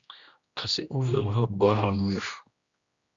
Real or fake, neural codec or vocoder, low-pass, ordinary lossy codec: fake; codec, 16 kHz, 1 kbps, X-Codec, HuBERT features, trained on general audio; 7.2 kHz; Opus, 64 kbps